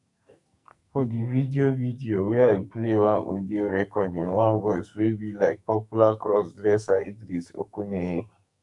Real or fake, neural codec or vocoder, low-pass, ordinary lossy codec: fake; codec, 44.1 kHz, 2.6 kbps, SNAC; 10.8 kHz; none